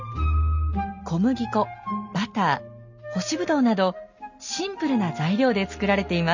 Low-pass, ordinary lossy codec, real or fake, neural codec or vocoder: 7.2 kHz; none; real; none